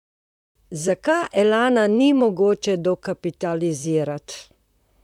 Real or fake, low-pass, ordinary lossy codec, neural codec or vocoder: fake; 19.8 kHz; none; vocoder, 44.1 kHz, 128 mel bands, Pupu-Vocoder